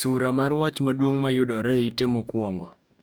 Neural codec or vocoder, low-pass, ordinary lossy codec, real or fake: codec, 44.1 kHz, 2.6 kbps, DAC; none; none; fake